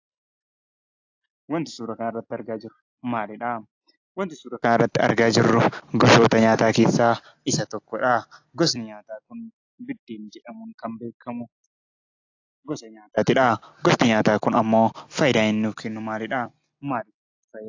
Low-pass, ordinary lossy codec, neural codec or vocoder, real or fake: 7.2 kHz; AAC, 48 kbps; none; real